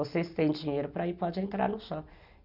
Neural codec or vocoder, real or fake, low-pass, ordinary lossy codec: none; real; 5.4 kHz; none